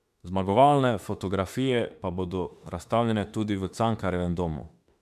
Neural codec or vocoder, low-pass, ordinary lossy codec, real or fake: autoencoder, 48 kHz, 32 numbers a frame, DAC-VAE, trained on Japanese speech; 14.4 kHz; MP3, 96 kbps; fake